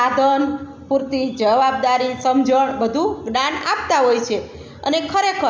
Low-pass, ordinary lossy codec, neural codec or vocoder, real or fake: none; none; none; real